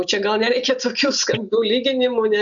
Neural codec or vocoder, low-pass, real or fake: none; 7.2 kHz; real